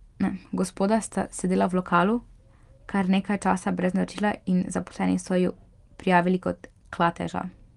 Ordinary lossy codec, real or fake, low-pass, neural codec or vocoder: Opus, 24 kbps; real; 10.8 kHz; none